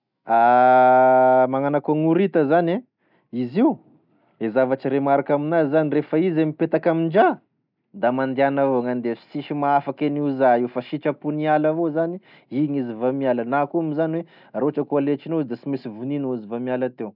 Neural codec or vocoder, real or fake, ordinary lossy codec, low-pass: none; real; none; 5.4 kHz